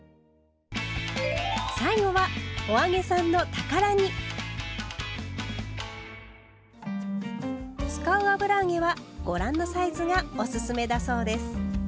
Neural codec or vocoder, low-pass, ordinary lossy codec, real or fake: none; none; none; real